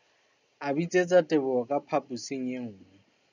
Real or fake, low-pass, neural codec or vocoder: real; 7.2 kHz; none